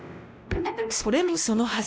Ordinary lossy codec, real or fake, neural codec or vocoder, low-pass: none; fake; codec, 16 kHz, 1 kbps, X-Codec, WavLM features, trained on Multilingual LibriSpeech; none